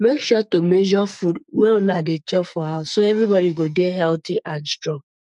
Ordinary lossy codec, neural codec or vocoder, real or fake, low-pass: none; codec, 44.1 kHz, 2.6 kbps, SNAC; fake; 10.8 kHz